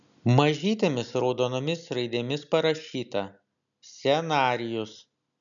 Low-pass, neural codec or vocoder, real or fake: 7.2 kHz; none; real